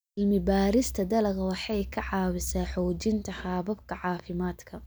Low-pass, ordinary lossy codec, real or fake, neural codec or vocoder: none; none; real; none